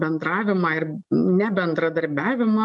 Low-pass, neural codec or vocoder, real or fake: 10.8 kHz; none; real